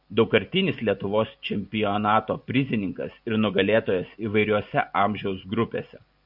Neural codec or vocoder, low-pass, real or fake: none; 5.4 kHz; real